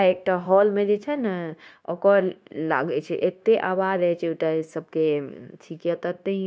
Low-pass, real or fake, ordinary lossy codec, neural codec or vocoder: none; fake; none; codec, 16 kHz, 0.9 kbps, LongCat-Audio-Codec